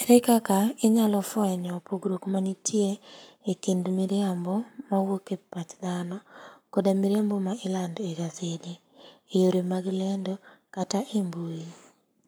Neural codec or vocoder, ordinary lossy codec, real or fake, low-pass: codec, 44.1 kHz, 7.8 kbps, Pupu-Codec; none; fake; none